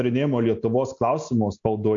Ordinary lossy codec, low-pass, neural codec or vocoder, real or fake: AAC, 64 kbps; 7.2 kHz; none; real